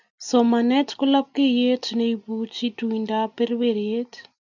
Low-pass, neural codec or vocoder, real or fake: 7.2 kHz; none; real